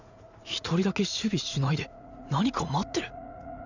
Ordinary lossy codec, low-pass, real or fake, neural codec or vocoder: none; 7.2 kHz; fake; vocoder, 44.1 kHz, 80 mel bands, Vocos